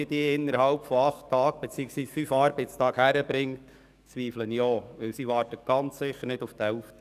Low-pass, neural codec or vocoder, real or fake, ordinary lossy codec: 14.4 kHz; codec, 44.1 kHz, 7.8 kbps, DAC; fake; none